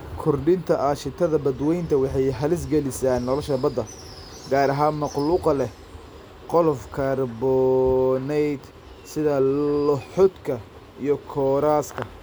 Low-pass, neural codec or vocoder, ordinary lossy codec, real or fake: none; none; none; real